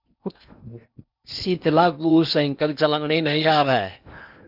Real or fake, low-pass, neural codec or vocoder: fake; 5.4 kHz; codec, 16 kHz in and 24 kHz out, 0.8 kbps, FocalCodec, streaming, 65536 codes